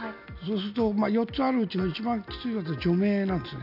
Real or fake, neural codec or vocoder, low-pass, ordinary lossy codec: real; none; 5.4 kHz; none